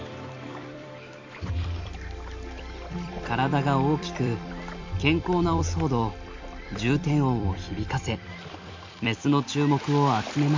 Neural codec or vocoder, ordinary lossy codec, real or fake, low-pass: vocoder, 44.1 kHz, 128 mel bands every 256 samples, BigVGAN v2; MP3, 64 kbps; fake; 7.2 kHz